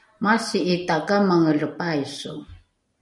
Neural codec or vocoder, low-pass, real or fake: none; 10.8 kHz; real